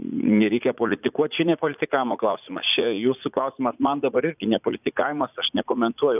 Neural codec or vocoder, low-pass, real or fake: vocoder, 44.1 kHz, 80 mel bands, Vocos; 3.6 kHz; fake